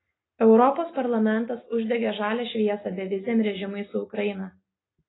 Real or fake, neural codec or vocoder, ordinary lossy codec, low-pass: real; none; AAC, 16 kbps; 7.2 kHz